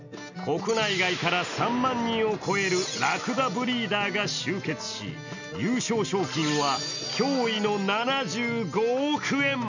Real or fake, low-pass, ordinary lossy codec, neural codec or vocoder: real; 7.2 kHz; none; none